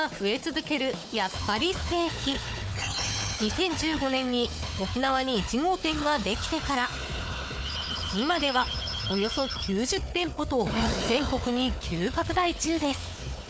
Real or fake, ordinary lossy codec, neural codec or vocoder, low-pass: fake; none; codec, 16 kHz, 4 kbps, FunCodec, trained on Chinese and English, 50 frames a second; none